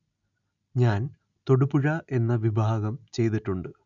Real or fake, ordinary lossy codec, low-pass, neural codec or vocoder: real; none; 7.2 kHz; none